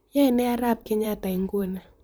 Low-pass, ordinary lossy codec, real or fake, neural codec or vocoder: none; none; fake; vocoder, 44.1 kHz, 128 mel bands, Pupu-Vocoder